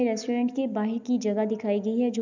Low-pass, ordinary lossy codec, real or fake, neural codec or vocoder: 7.2 kHz; none; real; none